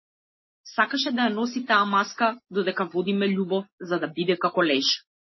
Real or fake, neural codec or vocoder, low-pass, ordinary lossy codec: real; none; 7.2 kHz; MP3, 24 kbps